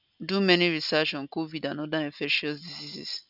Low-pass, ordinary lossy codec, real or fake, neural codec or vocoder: 5.4 kHz; none; real; none